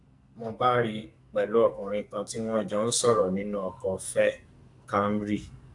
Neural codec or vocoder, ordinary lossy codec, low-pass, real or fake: codec, 44.1 kHz, 2.6 kbps, SNAC; AAC, 64 kbps; 10.8 kHz; fake